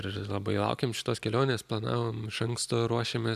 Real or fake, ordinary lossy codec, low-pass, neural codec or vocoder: real; MP3, 96 kbps; 14.4 kHz; none